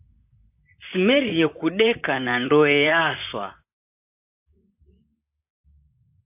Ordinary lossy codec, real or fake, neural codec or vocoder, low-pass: AAC, 32 kbps; fake; vocoder, 44.1 kHz, 128 mel bands, Pupu-Vocoder; 3.6 kHz